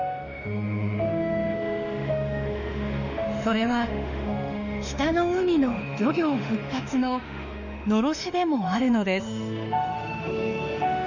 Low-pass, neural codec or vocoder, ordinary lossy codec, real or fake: 7.2 kHz; autoencoder, 48 kHz, 32 numbers a frame, DAC-VAE, trained on Japanese speech; none; fake